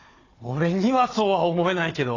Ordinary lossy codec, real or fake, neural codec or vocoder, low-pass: AAC, 32 kbps; fake; codec, 16 kHz, 4 kbps, FunCodec, trained on Chinese and English, 50 frames a second; 7.2 kHz